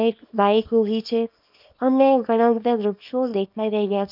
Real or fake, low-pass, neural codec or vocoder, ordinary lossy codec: fake; 5.4 kHz; codec, 24 kHz, 0.9 kbps, WavTokenizer, small release; none